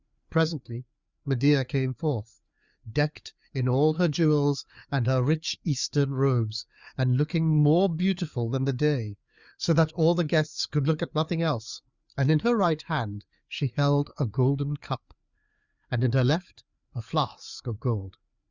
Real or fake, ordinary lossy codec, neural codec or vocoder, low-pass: fake; Opus, 64 kbps; codec, 16 kHz, 4 kbps, FreqCodec, larger model; 7.2 kHz